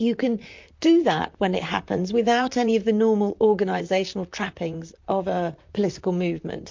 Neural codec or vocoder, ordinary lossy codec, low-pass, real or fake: vocoder, 44.1 kHz, 128 mel bands, Pupu-Vocoder; MP3, 48 kbps; 7.2 kHz; fake